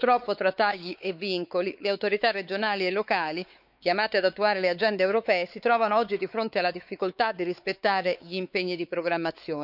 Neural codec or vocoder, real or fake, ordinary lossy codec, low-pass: codec, 16 kHz, 4 kbps, X-Codec, WavLM features, trained on Multilingual LibriSpeech; fake; none; 5.4 kHz